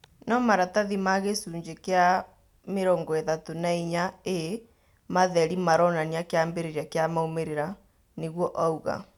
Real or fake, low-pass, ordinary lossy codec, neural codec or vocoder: real; 19.8 kHz; none; none